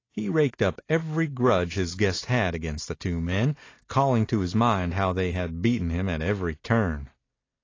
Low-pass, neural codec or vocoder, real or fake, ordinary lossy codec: 7.2 kHz; none; real; AAC, 32 kbps